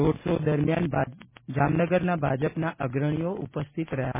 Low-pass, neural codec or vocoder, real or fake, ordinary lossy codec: 3.6 kHz; none; real; MP3, 16 kbps